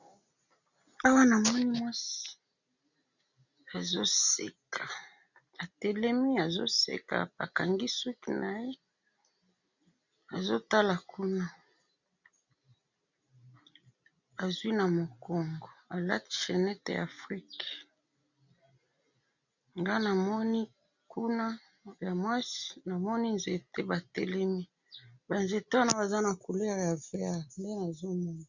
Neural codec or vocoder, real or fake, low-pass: none; real; 7.2 kHz